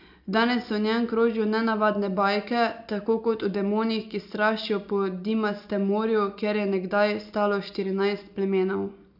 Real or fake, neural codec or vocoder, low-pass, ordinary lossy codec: real; none; 5.4 kHz; none